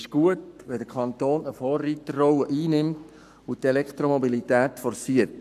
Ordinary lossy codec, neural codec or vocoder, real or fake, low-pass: none; codec, 44.1 kHz, 7.8 kbps, Pupu-Codec; fake; 14.4 kHz